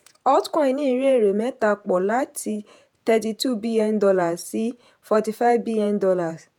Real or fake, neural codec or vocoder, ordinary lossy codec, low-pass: fake; vocoder, 48 kHz, 128 mel bands, Vocos; none; 19.8 kHz